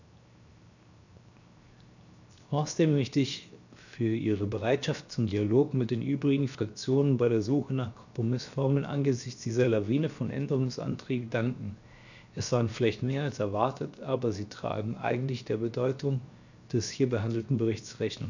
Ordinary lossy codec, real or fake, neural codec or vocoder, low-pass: none; fake; codec, 16 kHz, 0.7 kbps, FocalCodec; 7.2 kHz